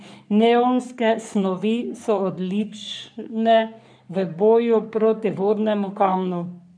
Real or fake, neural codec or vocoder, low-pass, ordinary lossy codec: fake; codec, 44.1 kHz, 3.4 kbps, Pupu-Codec; 9.9 kHz; none